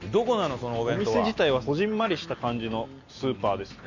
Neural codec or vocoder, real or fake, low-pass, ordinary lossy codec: none; real; 7.2 kHz; none